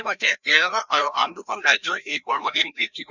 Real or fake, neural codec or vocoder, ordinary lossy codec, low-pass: fake; codec, 16 kHz, 1 kbps, FreqCodec, larger model; none; 7.2 kHz